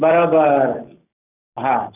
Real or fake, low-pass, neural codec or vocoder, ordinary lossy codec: real; 3.6 kHz; none; none